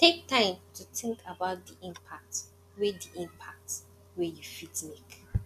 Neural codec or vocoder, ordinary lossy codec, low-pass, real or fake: none; none; 14.4 kHz; real